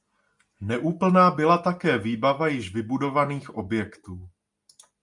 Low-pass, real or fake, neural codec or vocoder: 10.8 kHz; real; none